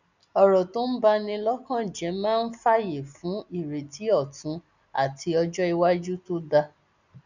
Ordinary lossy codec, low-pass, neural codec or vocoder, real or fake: none; 7.2 kHz; none; real